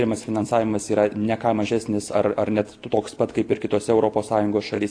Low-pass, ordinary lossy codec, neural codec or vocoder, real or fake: 9.9 kHz; AAC, 48 kbps; none; real